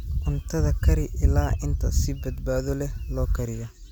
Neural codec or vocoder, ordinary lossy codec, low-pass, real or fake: none; none; none; real